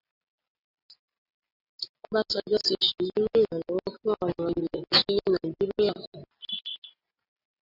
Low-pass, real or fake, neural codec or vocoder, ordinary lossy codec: 5.4 kHz; real; none; AAC, 32 kbps